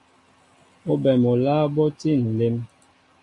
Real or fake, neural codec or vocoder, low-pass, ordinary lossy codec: real; none; 10.8 kHz; MP3, 48 kbps